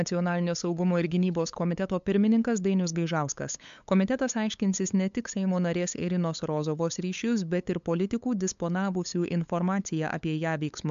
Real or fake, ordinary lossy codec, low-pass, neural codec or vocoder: fake; MP3, 64 kbps; 7.2 kHz; codec, 16 kHz, 8 kbps, FunCodec, trained on LibriTTS, 25 frames a second